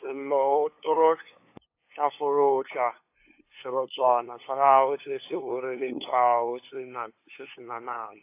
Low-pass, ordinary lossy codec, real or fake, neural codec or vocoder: 3.6 kHz; AAC, 24 kbps; fake; codec, 16 kHz, 2 kbps, FunCodec, trained on LibriTTS, 25 frames a second